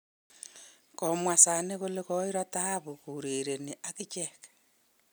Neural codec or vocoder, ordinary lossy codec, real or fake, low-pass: none; none; real; none